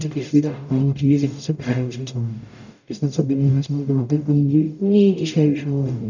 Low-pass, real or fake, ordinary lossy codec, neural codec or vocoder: 7.2 kHz; fake; none; codec, 44.1 kHz, 0.9 kbps, DAC